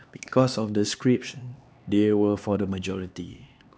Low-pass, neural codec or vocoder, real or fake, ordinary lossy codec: none; codec, 16 kHz, 2 kbps, X-Codec, HuBERT features, trained on LibriSpeech; fake; none